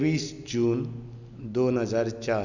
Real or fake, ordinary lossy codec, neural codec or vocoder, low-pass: real; none; none; 7.2 kHz